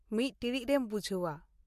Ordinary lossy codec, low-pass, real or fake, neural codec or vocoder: MP3, 64 kbps; 14.4 kHz; real; none